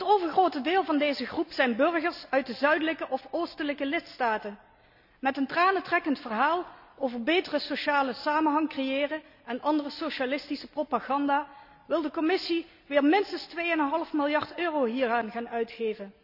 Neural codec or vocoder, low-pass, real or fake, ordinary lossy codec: none; 5.4 kHz; real; none